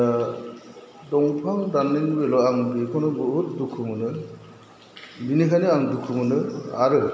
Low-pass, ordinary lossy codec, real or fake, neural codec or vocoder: none; none; real; none